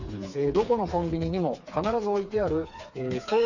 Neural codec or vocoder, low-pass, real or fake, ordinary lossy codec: codec, 16 kHz, 4 kbps, FreqCodec, smaller model; 7.2 kHz; fake; none